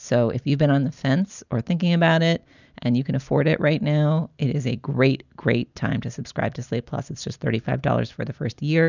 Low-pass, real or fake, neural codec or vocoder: 7.2 kHz; real; none